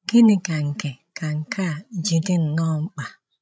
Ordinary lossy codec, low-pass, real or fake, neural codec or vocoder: none; none; fake; codec, 16 kHz, 16 kbps, FreqCodec, larger model